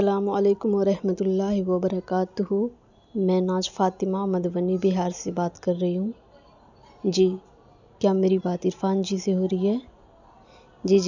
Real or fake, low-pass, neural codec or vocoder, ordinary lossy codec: real; 7.2 kHz; none; none